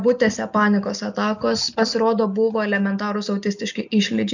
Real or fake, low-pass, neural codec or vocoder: real; 7.2 kHz; none